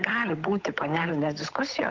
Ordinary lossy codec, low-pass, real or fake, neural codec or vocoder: Opus, 32 kbps; 7.2 kHz; fake; codec, 16 kHz, 8 kbps, FunCodec, trained on Chinese and English, 25 frames a second